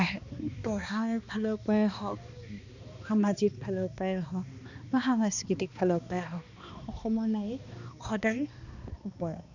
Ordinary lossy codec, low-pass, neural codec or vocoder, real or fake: none; 7.2 kHz; codec, 16 kHz, 2 kbps, X-Codec, HuBERT features, trained on balanced general audio; fake